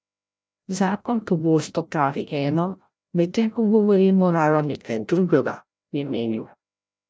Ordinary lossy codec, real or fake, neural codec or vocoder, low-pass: none; fake; codec, 16 kHz, 0.5 kbps, FreqCodec, larger model; none